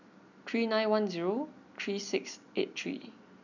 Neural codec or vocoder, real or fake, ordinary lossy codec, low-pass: none; real; none; 7.2 kHz